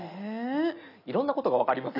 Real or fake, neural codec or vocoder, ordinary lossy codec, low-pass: real; none; none; 5.4 kHz